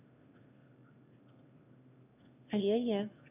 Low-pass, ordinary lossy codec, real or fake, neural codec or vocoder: 3.6 kHz; none; fake; autoencoder, 22.05 kHz, a latent of 192 numbers a frame, VITS, trained on one speaker